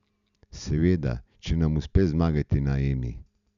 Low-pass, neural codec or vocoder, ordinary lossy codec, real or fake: 7.2 kHz; none; none; real